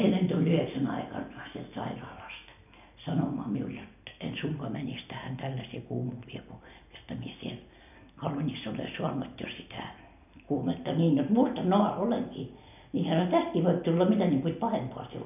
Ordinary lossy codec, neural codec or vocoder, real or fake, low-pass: none; none; real; 3.6 kHz